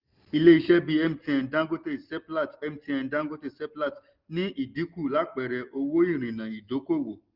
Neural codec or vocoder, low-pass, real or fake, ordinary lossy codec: none; 5.4 kHz; real; Opus, 32 kbps